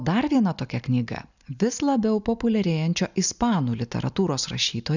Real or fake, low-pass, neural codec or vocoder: real; 7.2 kHz; none